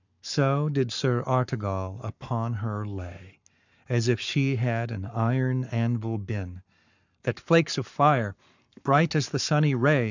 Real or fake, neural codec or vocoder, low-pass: fake; codec, 44.1 kHz, 7.8 kbps, Pupu-Codec; 7.2 kHz